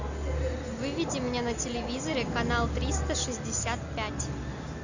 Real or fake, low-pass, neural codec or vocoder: real; 7.2 kHz; none